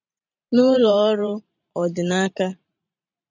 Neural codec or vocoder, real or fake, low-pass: vocoder, 44.1 kHz, 128 mel bands every 512 samples, BigVGAN v2; fake; 7.2 kHz